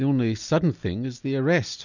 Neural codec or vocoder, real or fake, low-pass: none; real; 7.2 kHz